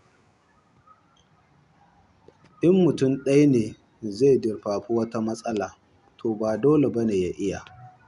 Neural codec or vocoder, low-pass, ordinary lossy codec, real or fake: none; 10.8 kHz; none; real